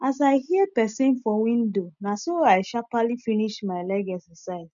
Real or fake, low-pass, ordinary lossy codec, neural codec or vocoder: real; 7.2 kHz; none; none